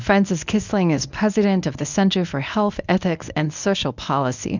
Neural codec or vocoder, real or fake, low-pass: codec, 24 kHz, 0.9 kbps, WavTokenizer, medium speech release version 1; fake; 7.2 kHz